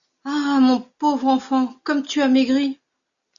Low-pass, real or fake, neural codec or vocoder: 7.2 kHz; real; none